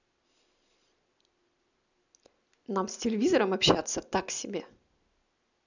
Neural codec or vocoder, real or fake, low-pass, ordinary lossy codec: none; real; 7.2 kHz; none